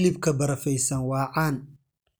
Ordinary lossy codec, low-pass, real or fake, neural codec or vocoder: none; none; real; none